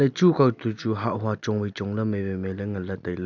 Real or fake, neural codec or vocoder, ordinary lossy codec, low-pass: real; none; none; 7.2 kHz